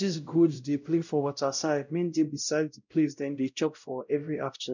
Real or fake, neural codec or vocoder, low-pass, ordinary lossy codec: fake; codec, 16 kHz, 0.5 kbps, X-Codec, WavLM features, trained on Multilingual LibriSpeech; 7.2 kHz; none